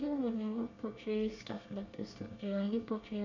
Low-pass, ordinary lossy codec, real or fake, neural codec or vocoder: 7.2 kHz; none; fake; codec, 24 kHz, 1 kbps, SNAC